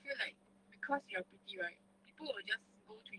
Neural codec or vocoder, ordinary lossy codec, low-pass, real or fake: codec, 44.1 kHz, 7.8 kbps, DAC; Opus, 24 kbps; 9.9 kHz; fake